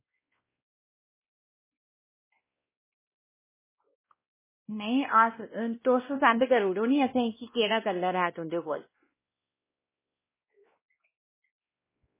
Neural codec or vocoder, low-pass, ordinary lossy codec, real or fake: codec, 16 kHz, 1 kbps, X-Codec, WavLM features, trained on Multilingual LibriSpeech; 3.6 kHz; MP3, 16 kbps; fake